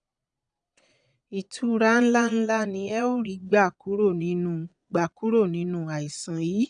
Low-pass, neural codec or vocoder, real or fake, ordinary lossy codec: 9.9 kHz; vocoder, 22.05 kHz, 80 mel bands, Vocos; fake; none